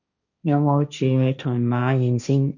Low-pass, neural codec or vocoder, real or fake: 7.2 kHz; codec, 16 kHz, 1.1 kbps, Voila-Tokenizer; fake